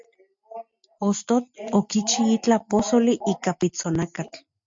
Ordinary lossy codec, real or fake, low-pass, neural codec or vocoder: MP3, 48 kbps; real; 7.2 kHz; none